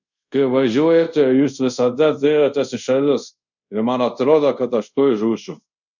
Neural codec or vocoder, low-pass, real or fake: codec, 24 kHz, 0.5 kbps, DualCodec; 7.2 kHz; fake